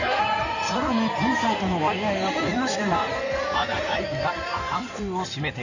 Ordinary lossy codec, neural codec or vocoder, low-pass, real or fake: none; codec, 16 kHz in and 24 kHz out, 2.2 kbps, FireRedTTS-2 codec; 7.2 kHz; fake